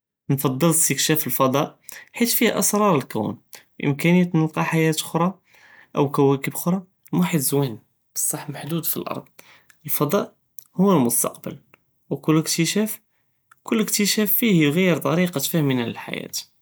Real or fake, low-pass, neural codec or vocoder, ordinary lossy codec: real; none; none; none